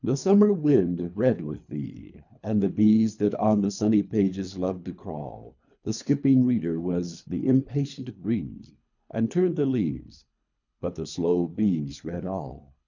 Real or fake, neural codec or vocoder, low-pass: fake; codec, 24 kHz, 3 kbps, HILCodec; 7.2 kHz